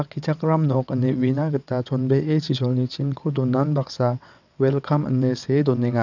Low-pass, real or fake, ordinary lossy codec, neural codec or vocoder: 7.2 kHz; fake; none; vocoder, 22.05 kHz, 80 mel bands, WaveNeXt